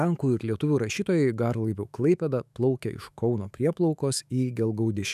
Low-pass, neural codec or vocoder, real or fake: 14.4 kHz; autoencoder, 48 kHz, 128 numbers a frame, DAC-VAE, trained on Japanese speech; fake